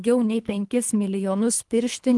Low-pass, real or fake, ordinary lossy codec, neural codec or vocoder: 10.8 kHz; fake; Opus, 24 kbps; codec, 24 kHz, 3 kbps, HILCodec